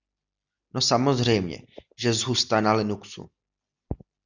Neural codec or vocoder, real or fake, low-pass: none; real; 7.2 kHz